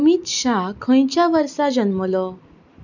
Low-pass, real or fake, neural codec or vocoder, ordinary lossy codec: 7.2 kHz; real; none; none